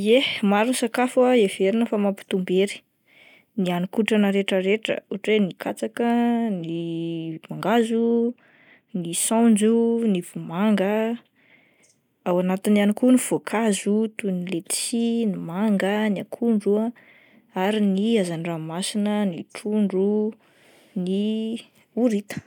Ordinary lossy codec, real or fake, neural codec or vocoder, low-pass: none; real; none; 19.8 kHz